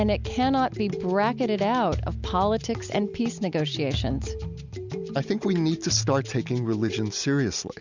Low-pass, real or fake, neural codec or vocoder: 7.2 kHz; real; none